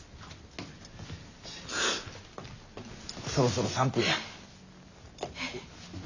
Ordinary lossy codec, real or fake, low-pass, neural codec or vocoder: none; real; 7.2 kHz; none